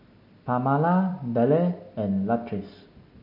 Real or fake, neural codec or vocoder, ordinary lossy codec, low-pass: real; none; MP3, 48 kbps; 5.4 kHz